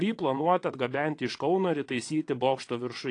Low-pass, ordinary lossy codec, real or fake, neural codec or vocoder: 9.9 kHz; AAC, 48 kbps; fake; vocoder, 22.05 kHz, 80 mel bands, Vocos